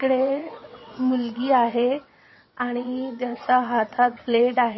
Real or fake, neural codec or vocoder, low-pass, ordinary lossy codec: fake; vocoder, 22.05 kHz, 80 mel bands, WaveNeXt; 7.2 kHz; MP3, 24 kbps